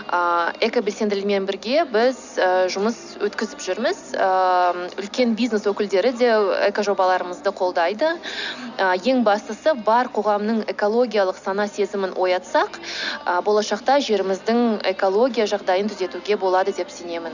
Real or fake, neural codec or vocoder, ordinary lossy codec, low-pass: real; none; none; 7.2 kHz